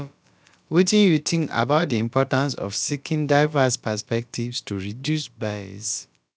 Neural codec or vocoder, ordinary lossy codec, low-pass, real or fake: codec, 16 kHz, about 1 kbps, DyCAST, with the encoder's durations; none; none; fake